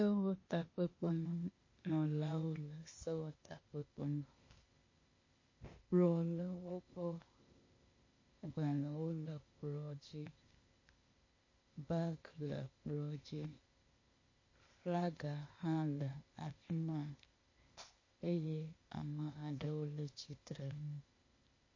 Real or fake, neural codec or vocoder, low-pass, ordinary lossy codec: fake; codec, 16 kHz, 0.8 kbps, ZipCodec; 7.2 kHz; MP3, 32 kbps